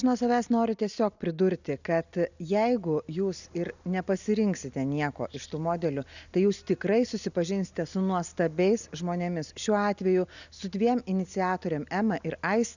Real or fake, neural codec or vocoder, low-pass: real; none; 7.2 kHz